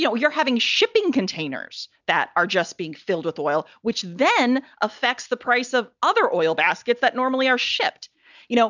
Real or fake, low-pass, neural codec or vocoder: real; 7.2 kHz; none